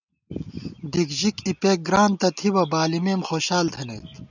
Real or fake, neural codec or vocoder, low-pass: real; none; 7.2 kHz